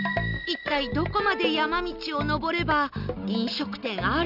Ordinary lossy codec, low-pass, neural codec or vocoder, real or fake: none; 5.4 kHz; none; real